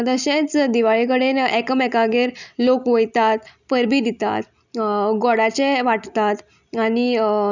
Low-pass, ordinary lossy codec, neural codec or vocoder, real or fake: 7.2 kHz; none; none; real